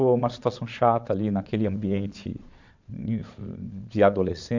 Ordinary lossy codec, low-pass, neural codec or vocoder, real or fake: MP3, 64 kbps; 7.2 kHz; vocoder, 22.05 kHz, 80 mel bands, Vocos; fake